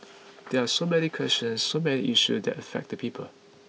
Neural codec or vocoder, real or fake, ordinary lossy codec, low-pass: none; real; none; none